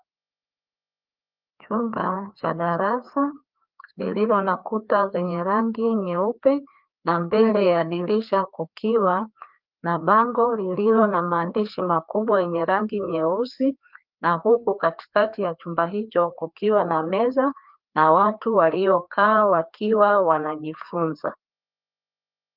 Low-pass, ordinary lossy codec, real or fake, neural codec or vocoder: 5.4 kHz; Opus, 32 kbps; fake; codec, 16 kHz, 2 kbps, FreqCodec, larger model